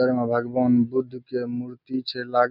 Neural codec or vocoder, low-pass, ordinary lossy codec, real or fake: none; 5.4 kHz; none; real